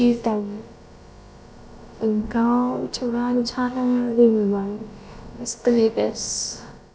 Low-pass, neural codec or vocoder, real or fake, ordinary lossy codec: none; codec, 16 kHz, about 1 kbps, DyCAST, with the encoder's durations; fake; none